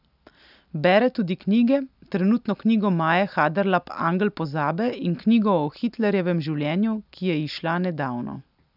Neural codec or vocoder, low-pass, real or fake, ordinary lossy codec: none; 5.4 kHz; real; none